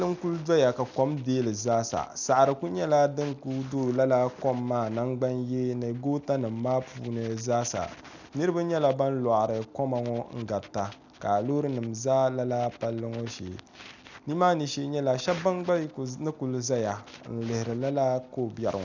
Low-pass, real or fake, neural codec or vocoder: 7.2 kHz; real; none